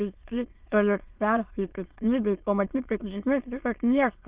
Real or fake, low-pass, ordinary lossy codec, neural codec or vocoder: fake; 3.6 kHz; Opus, 32 kbps; autoencoder, 22.05 kHz, a latent of 192 numbers a frame, VITS, trained on many speakers